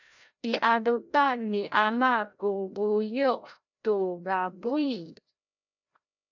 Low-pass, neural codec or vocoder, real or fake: 7.2 kHz; codec, 16 kHz, 0.5 kbps, FreqCodec, larger model; fake